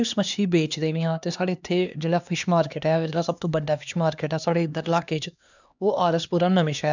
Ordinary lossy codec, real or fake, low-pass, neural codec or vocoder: none; fake; 7.2 kHz; codec, 16 kHz, 2 kbps, X-Codec, HuBERT features, trained on LibriSpeech